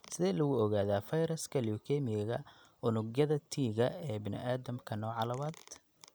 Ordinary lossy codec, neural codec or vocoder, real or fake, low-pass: none; none; real; none